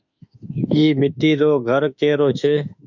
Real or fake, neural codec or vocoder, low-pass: fake; autoencoder, 48 kHz, 32 numbers a frame, DAC-VAE, trained on Japanese speech; 7.2 kHz